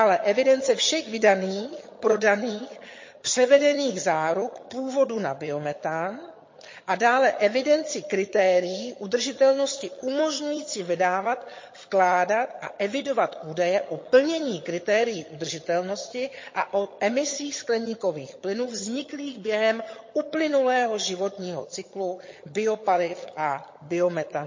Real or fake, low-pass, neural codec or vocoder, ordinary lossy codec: fake; 7.2 kHz; vocoder, 22.05 kHz, 80 mel bands, HiFi-GAN; MP3, 32 kbps